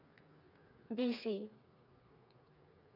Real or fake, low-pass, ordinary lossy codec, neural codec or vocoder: fake; 5.4 kHz; none; codec, 16 kHz, 4 kbps, FreqCodec, smaller model